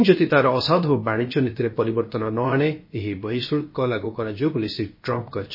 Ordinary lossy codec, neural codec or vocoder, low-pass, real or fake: MP3, 24 kbps; codec, 16 kHz, about 1 kbps, DyCAST, with the encoder's durations; 5.4 kHz; fake